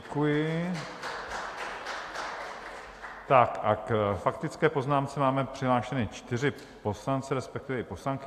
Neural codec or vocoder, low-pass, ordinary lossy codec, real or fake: none; 14.4 kHz; AAC, 64 kbps; real